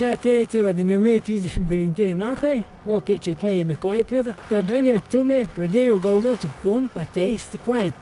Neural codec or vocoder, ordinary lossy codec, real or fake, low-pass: codec, 24 kHz, 0.9 kbps, WavTokenizer, medium music audio release; MP3, 96 kbps; fake; 10.8 kHz